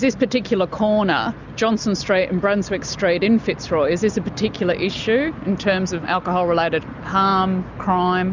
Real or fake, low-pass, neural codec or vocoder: real; 7.2 kHz; none